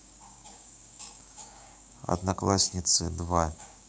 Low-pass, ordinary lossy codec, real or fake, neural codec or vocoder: none; none; fake; codec, 16 kHz, 6 kbps, DAC